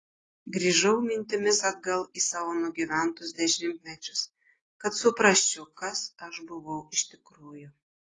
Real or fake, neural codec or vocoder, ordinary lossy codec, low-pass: real; none; AAC, 32 kbps; 10.8 kHz